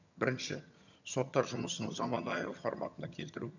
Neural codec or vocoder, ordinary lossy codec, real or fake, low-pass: vocoder, 22.05 kHz, 80 mel bands, HiFi-GAN; none; fake; 7.2 kHz